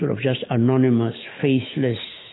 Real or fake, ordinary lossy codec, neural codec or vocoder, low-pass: fake; AAC, 16 kbps; autoencoder, 48 kHz, 128 numbers a frame, DAC-VAE, trained on Japanese speech; 7.2 kHz